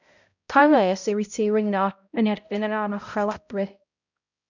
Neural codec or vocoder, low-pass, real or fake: codec, 16 kHz, 0.5 kbps, X-Codec, HuBERT features, trained on balanced general audio; 7.2 kHz; fake